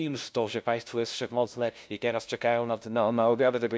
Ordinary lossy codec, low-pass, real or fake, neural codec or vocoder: none; none; fake; codec, 16 kHz, 0.5 kbps, FunCodec, trained on LibriTTS, 25 frames a second